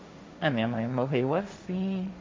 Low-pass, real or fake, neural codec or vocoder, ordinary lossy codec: none; fake; codec, 16 kHz, 1.1 kbps, Voila-Tokenizer; none